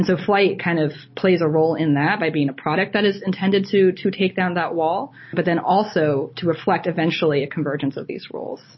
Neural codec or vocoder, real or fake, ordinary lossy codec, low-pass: none; real; MP3, 24 kbps; 7.2 kHz